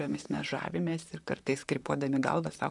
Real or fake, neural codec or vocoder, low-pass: fake; vocoder, 44.1 kHz, 128 mel bands, Pupu-Vocoder; 10.8 kHz